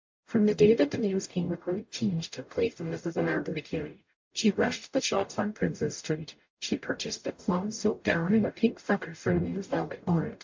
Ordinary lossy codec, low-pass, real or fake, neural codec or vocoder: MP3, 48 kbps; 7.2 kHz; fake; codec, 44.1 kHz, 0.9 kbps, DAC